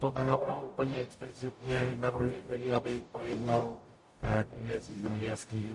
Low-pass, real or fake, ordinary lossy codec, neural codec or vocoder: 10.8 kHz; fake; MP3, 48 kbps; codec, 44.1 kHz, 0.9 kbps, DAC